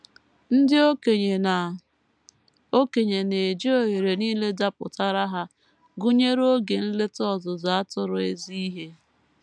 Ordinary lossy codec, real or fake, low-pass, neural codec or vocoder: none; real; none; none